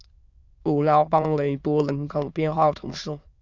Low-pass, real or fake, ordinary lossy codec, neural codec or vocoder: 7.2 kHz; fake; Opus, 64 kbps; autoencoder, 22.05 kHz, a latent of 192 numbers a frame, VITS, trained on many speakers